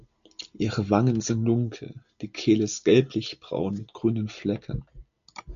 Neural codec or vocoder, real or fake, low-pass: none; real; 7.2 kHz